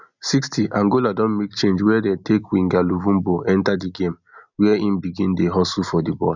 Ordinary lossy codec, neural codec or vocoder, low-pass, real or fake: none; none; 7.2 kHz; real